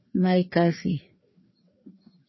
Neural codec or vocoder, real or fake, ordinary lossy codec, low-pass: codec, 16 kHz, 2 kbps, FreqCodec, larger model; fake; MP3, 24 kbps; 7.2 kHz